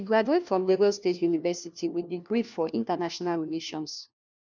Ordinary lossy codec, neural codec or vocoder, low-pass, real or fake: none; codec, 16 kHz, 1 kbps, FunCodec, trained on LibriTTS, 50 frames a second; 7.2 kHz; fake